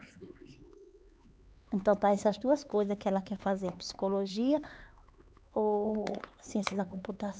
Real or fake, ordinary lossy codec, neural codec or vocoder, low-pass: fake; none; codec, 16 kHz, 4 kbps, X-Codec, HuBERT features, trained on LibriSpeech; none